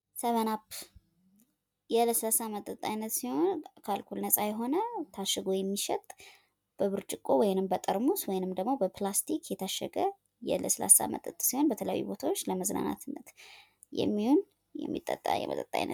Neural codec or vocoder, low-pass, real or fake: none; 19.8 kHz; real